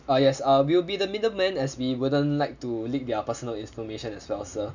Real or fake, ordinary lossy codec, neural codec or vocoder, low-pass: real; none; none; 7.2 kHz